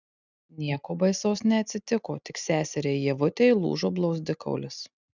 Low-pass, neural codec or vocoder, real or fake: 7.2 kHz; none; real